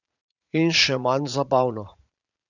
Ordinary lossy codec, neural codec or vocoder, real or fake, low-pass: none; vocoder, 44.1 kHz, 80 mel bands, Vocos; fake; 7.2 kHz